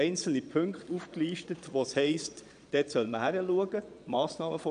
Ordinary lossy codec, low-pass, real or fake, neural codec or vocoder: none; 9.9 kHz; fake; vocoder, 22.05 kHz, 80 mel bands, Vocos